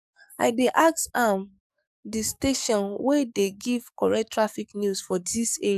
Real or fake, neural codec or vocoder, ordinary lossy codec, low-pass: fake; codec, 44.1 kHz, 7.8 kbps, DAC; none; 14.4 kHz